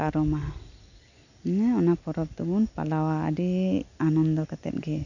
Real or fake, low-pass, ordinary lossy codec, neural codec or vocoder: real; 7.2 kHz; none; none